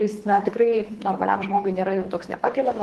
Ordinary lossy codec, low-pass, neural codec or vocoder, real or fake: Opus, 16 kbps; 10.8 kHz; codec, 24 kHz, 3 kbps, HILCodec; fake